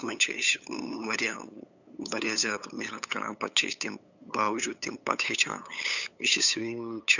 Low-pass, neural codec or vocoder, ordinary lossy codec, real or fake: 7.2 kHz; codec, 16 kHz, 8 kbps, FunCodec, trained on LibriTTS, 25 frames a second; none; fake